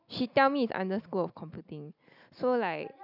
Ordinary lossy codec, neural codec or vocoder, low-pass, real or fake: none; none; 5.4 kHz; real